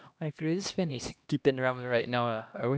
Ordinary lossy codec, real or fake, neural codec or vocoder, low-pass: none; fake; codec, 16 kHz, 1 kbps, X-Codec, HuBERT features, trained on LibriSpeech; none